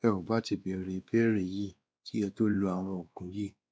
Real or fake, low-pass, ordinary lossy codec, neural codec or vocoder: fake; none; none; codec, 16 kHz, 2 kbps, X-Codec, WavLM features, trained on Multilingual LibriSpeech